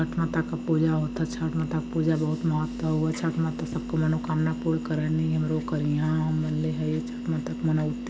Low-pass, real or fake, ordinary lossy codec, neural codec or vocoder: none; real; none; none